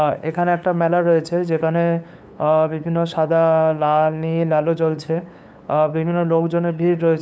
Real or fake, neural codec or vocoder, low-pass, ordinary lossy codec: fake; codec, 16 kHz, 8 kbps, FunCodec, trained on LibriTTS, 25 frames a second; none; none